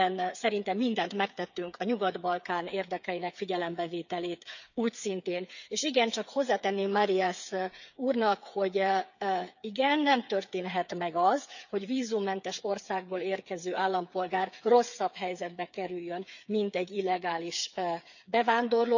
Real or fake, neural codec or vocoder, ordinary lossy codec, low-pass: fake; codec, 16 kHz, 8 kbps, FreqCodec, smaller model; none; 7.2 kHz